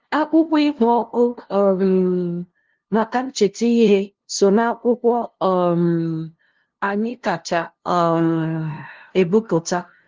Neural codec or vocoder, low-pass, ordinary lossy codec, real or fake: codec, 16 kHz, 0.5 kbps, FunCodec, trained on LibriTTS, 25 frames a second; 7.2 kHz; Opus, 16 kbps; fake